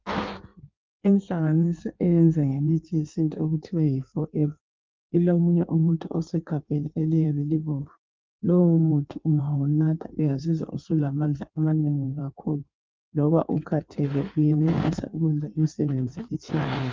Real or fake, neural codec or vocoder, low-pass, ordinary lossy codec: fake; codec, 16 kHz in and 24 kHz out, 1.1 kbps, FireRedTTS-2 codec; 7.2 kHz; Opus, 24 kbps